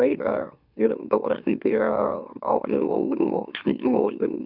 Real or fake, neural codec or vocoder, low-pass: fake; autoencoder, 44.1 kHz, a latent of 192 numbers a frame, MeloTTS; 5.4 kHz